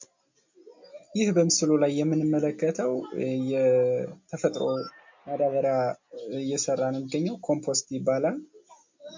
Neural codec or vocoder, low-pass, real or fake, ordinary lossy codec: none; 7.2 kHz; real; MP3, 48 kbps